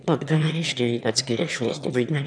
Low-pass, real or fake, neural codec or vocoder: 9.9 kHz; fake; autoencoder, 22.05 kHz, a latent of 192 numbers a frame, VITS, trained on one speaker